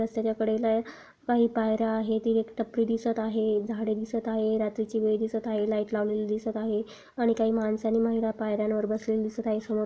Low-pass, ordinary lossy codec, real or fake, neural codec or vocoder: none; none; real; none